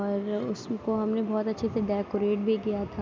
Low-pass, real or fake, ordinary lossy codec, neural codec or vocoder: none; real; none; none